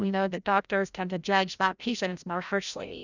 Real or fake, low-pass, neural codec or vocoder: fake; 7.2 kHz; codec, 16 kHz, 0.5 kbps, FreqCodec, larger model